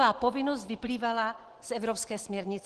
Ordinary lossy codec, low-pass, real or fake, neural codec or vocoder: Opus, 16 kbps; 10.8 kHz; real; none